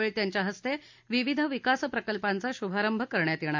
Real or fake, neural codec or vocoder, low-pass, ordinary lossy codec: real; none; 7.2 kHz; MP3, 64 kbps